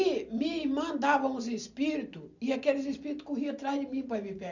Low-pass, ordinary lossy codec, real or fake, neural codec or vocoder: 7.2 kHz; none; real; none